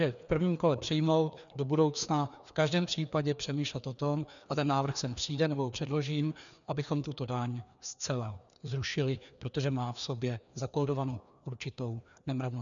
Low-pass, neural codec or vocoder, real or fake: 7.2 kHz; codec, 16 kHz, 2 kbps, FreqCodec, larger model; fake